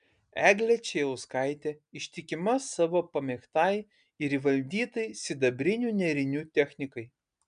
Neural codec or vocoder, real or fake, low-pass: none; real; 9.9 kHz